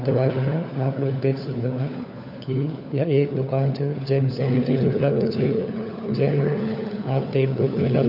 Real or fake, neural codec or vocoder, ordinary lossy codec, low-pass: fake; codec, 16 kHz, 4 kbps, FunCodec, trained on LibriTTS, 50 frames a second; none; 5.4 kHz